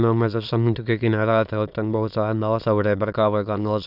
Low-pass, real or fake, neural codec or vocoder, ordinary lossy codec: 5.4 kHz; fake; autoencoder, 22.05 kHz, a latent of 192 numbers a frame, VITS, trained on many speakers; none